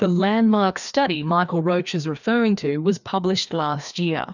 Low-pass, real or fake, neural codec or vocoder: 7.2 kHz; fake; codec, 16 kHz, 2 kbps, FreqCodec, larger model